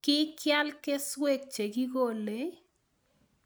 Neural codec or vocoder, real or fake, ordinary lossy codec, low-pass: none; real; none; none